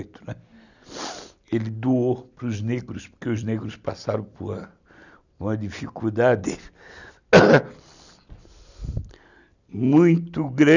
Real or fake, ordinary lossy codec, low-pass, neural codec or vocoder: real; none; 7.2 kHz; none